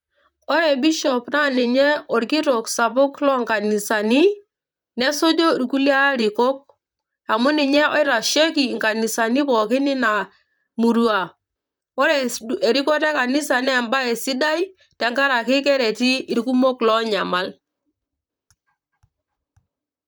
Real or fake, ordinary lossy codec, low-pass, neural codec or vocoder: fake; none; none; vocoder, 44.1 kHz, 128 mel bands, Pupu-Vocoder